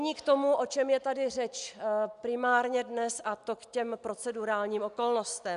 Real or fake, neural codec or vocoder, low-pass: real; none; 10.8 kHz